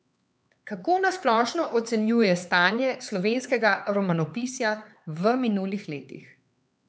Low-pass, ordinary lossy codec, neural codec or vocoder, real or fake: none; none; codec, 16 kHz, 4 kbps, X-Codec, HuBERT features, trained on LibriSpeech; fake